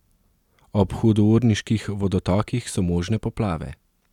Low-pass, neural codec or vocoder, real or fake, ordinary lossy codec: 19.8 kHz; none; real; none